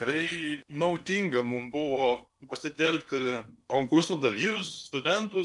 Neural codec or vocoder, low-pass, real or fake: codec, 16 kHz in and 24 kHz out, 0.8 kbps, FocalCodec, streaming, 65536 codes; 10.8 kHz; fake